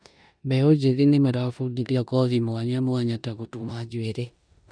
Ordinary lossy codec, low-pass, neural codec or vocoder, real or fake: none; 9.9 kHz; codec, 16 kHz in and 24 kHz out, 0.9 kbps, LongCat-Audio-Codec, four codebook decoder; fake